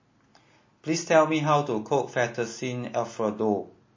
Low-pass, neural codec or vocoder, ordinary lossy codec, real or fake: 7.2 kHz; none; MP3, 32 kbps; real